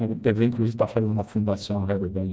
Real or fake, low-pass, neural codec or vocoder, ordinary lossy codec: fake; none; codec, 16 kHz, 1 kbps, FreqCodec, smaller model; none